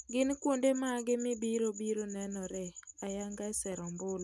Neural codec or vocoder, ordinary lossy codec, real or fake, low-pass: none; none; real; none